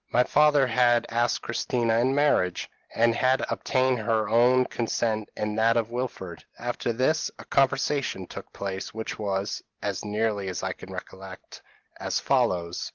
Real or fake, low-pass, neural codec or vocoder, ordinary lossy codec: real; 7.2 kHz; none; Opus, 16 kbps